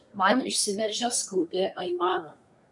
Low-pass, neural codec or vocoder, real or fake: 10.8 kHz; codec, 24 kHz, 1 kbps, SNAC; fake